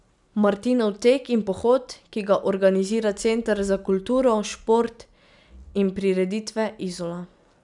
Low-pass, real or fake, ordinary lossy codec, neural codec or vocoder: 10.8 kHz; real; none; none